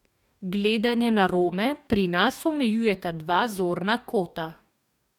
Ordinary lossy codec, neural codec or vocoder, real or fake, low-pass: none; codec, 44.1 kHz, 2.6 kbps, DAC; fake; 19.8 kHz